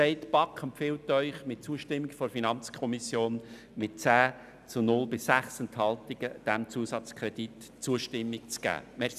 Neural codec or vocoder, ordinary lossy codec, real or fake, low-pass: none; none; real; 14.4 kHz